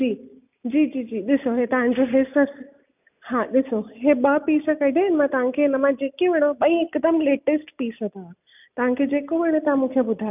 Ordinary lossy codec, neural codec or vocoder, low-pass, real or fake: none; none; 3.6 kHz; real